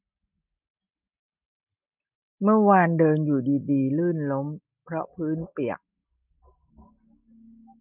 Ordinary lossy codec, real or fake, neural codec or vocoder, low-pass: none; real; none; 3.6 kHz